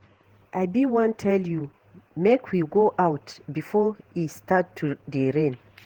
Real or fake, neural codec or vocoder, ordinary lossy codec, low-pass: fake; vocoder, 48 kHz, 128 mel bands, Vocos; Opus, 16 kbps; 19.8 kHz